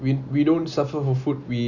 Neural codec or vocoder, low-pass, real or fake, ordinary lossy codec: none; 7.2 kHz; real; none